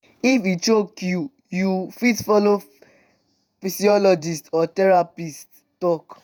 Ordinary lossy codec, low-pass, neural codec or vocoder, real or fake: none; none; vocoder, 48 kHz, 128 mel bands, Vocos; fake